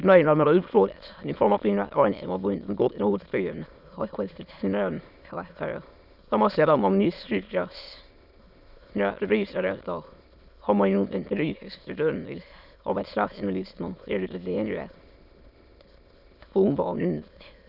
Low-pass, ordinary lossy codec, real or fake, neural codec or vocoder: 5.4 kHz; none; fake; autoencoder, 22.05 kHz, a latent of 192 numbers a frame, VITS, trained on many speakers